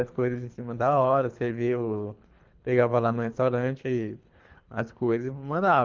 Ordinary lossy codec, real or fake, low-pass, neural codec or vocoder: Opus, 24 kbps; fake; 7.2 kHz; codec, 24 kHz, 3 kbps, HILCodec